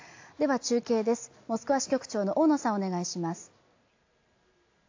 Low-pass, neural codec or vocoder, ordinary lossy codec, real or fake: 7.2 kHz; none; AAC, 48 kbps; real